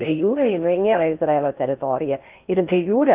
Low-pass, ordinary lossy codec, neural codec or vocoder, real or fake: 3.6 kHz; Opus, 32 kbps; codec, 16 kHz in and 24 kHz out, 0.6 kbps, FocalCodec, streaming, 4096 codes; fake